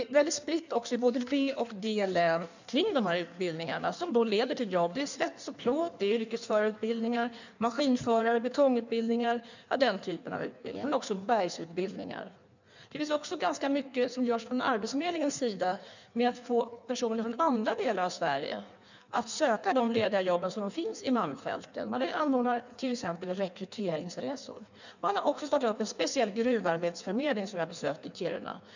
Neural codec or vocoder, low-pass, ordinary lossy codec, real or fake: codec, 16 kHz in and 24 kHz out, 1.1 kbps, FireRedTTS-2 codec; 7.2 kHz; none; fake